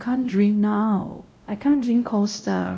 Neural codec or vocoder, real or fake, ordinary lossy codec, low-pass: codec, 16 kHz, 0.5 kbps, X-Codec, WavLM features, trained on Multilingual LibriSpeech; fake; none; none